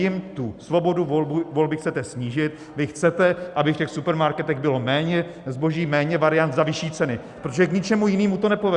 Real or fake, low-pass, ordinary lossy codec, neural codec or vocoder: real; 10.8 kHz; Opus, 64 kbps; none